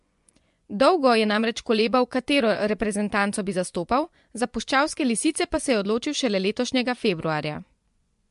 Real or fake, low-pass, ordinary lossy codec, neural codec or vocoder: fake; 10.8 kHz; MP3, 64 kbps; vocoder, 24 kHz, 100 mel bands, Vocos